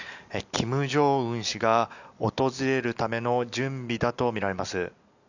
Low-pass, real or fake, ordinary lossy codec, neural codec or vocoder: 7.2 kHz; real; none; none